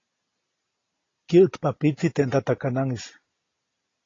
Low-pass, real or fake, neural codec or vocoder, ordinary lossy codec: 7.2 kHz; real; none; AAC, 32 kbps